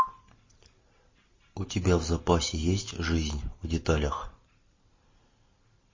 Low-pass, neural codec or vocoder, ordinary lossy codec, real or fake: 7.2 kHz; none; MP3, 32 kbps; real